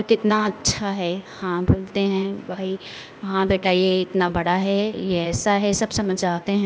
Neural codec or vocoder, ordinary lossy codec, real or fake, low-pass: codec, 16 kHz, 0.8 kbps, ZipCodec; none; fake; none